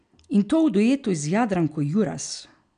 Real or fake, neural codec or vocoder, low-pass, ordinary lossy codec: real; none; 9.9 kHz; none